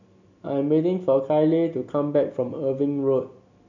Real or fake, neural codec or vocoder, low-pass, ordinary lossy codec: real; none; 7.2 kHz; none